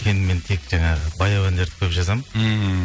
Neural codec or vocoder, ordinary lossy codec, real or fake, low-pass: none; none; real; none